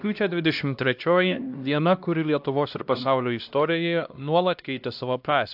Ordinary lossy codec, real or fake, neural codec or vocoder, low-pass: AAC, 48 kbps; fake; codec, 16 kHz, 1 kbps, X-Codec, HuBERT features, trained on LibriSpeech; 5.4 kHz